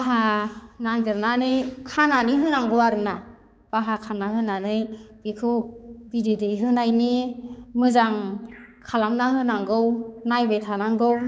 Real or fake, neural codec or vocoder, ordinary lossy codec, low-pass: fake; codec, 16 kHz, 4 kbps, X-Codec, HuBERT features, trained on balanced general audio; none; none